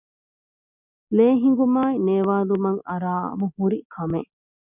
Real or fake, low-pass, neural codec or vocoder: real; 3.6 kHz; none